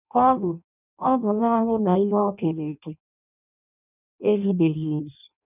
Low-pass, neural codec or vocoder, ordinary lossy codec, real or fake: 3.6 kHz; codec, 16 kHz in and 24 kHz out, 0.6 kbps, FireRedTTS-2 codec; none; fake